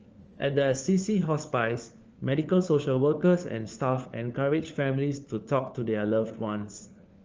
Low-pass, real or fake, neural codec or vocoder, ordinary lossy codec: 7.2 kHz; fake; codec, 16 kHz, 4 kbps, FunCodec, trained on LibriTTS, 50 frames a second; Opus, 24 kbps